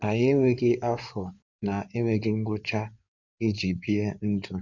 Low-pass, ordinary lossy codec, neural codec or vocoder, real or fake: 7.2 kHz; none; codec, 16 kHz in and 24 kHz out, 2.2 kbps, FireRedTTS-2 codec; fake